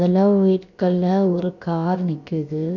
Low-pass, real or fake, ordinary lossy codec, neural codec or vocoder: 7.2 kHz; fake; none; codec, 16 kHz, about 1 kbps, DyCAST, with the encoder's durations